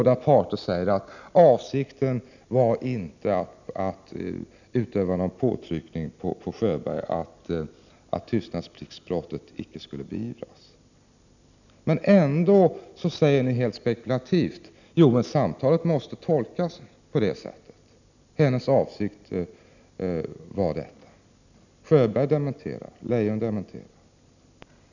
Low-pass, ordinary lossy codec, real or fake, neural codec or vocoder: 7.2 kHz; none; real; none